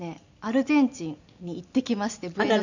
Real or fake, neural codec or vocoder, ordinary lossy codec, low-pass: real; none; none; 7.2 kHz